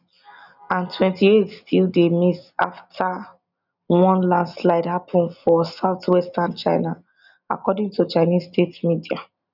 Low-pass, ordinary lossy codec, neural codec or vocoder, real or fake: 5.4 kHz; none; none; real